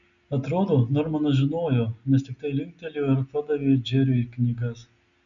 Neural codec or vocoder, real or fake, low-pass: none; real; 7.2 kHz